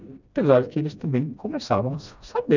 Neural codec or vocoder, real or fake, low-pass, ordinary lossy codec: codec, 16 kHz, 1 kbps, FreqCodec, smaller model; fake; 7.2 kHz; none